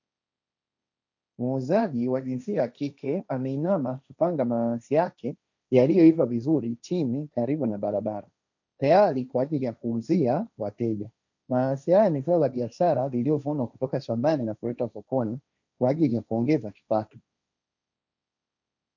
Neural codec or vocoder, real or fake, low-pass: codec, 16 kHz, 1.1 kbps, Voila-Tokenizer; fake; 7.2 kHz